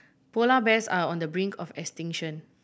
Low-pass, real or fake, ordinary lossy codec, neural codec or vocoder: none; real; none; none